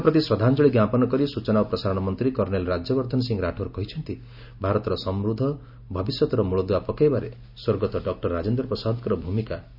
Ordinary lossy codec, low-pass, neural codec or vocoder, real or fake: none; 5.4 kHz; none; real